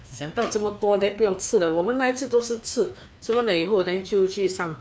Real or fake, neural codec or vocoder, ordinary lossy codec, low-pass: fake; codec, 16 kHz, 2 kbps, FreqCodec, larger model; none; none